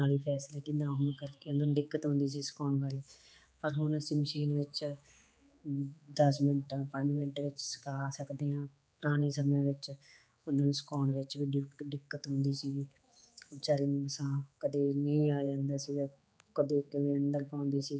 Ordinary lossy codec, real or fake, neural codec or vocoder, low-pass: none; fake; codec, 16 kHz, 4 kbps, X-Codec, HuBERT features, trained on general audio; none